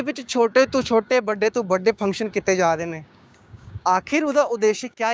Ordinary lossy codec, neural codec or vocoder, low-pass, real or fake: none; codec, 16 kHz, 6 kbps, DAC; none; fake